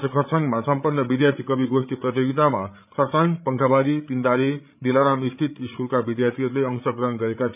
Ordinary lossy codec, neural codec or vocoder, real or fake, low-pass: none; codec, 16 kHz, 8 kbps, FreqCodec, larger model; fake; 3.6 kHz